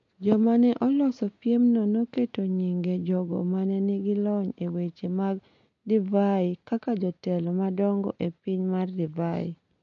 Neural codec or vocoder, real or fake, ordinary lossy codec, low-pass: none; real; MP3, 48 kbps; 7.2 kHz